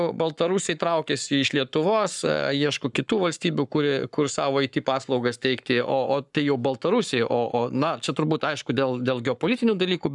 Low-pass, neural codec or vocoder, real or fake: 10.8 kHz; codec, 44.1 kHz, 7.8 kbps, Pupu-Codec; fake